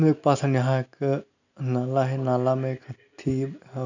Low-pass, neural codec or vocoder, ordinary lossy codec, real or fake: 7.2 kHz; none; none; real